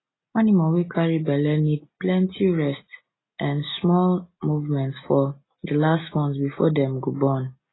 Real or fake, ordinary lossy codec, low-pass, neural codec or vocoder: real; AAC, 16 kbps; 7.2 kHz; none